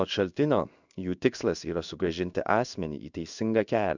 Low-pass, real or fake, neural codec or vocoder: 7.2 kHz; fake; codec, 16 kHz in and 24 kHz out, 1 kbps, XY-Tokenizer